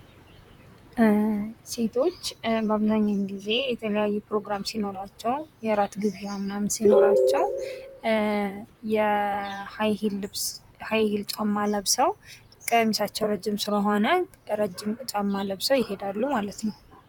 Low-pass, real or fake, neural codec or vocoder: 19.8 kHz; fake; vocoder, 44.1 kHz, 128 mel bands, Pupu-Vocoder